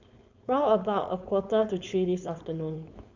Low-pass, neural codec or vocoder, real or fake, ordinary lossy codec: 7.2 kHz; codec, 16 kHz, 4.8 kbps, FACodec; fake; Opus, 64 kbps